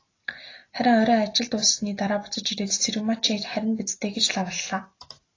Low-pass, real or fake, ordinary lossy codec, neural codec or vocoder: 7.2 kHz; real; AAC, 32 kbps; none